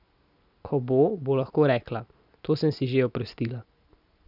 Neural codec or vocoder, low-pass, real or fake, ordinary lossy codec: none; 5.4 kHz; real; none